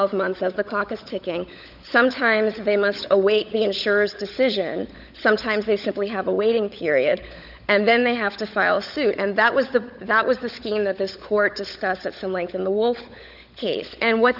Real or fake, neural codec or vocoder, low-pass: fake; codec, 16 kHz, 16 kbps, FunCodec, trained on LibriTTS, 50 frames a second; 5.4 kHz